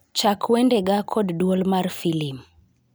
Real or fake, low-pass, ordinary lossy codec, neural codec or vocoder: real; none; none; none